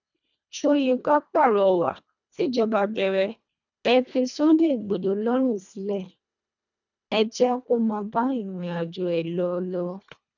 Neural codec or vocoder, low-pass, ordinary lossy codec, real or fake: codec, 24 kHz, 1.5 kbps, HILCodec; 7.2 kHz; none; fake